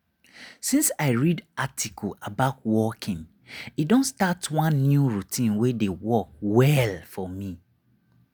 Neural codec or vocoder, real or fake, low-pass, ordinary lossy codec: none; real; none; none